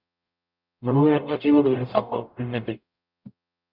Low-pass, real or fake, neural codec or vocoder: 5.4 kHz; fake; codec, 44.1 kHz, 0.9 kbps, DAC